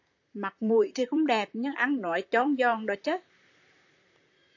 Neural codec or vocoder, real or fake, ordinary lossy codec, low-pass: vocoder, 44.1 kHz, 128 mel bands, Pupu-Vocoder; fake; AAC, 48 kbps; 7.2 kHz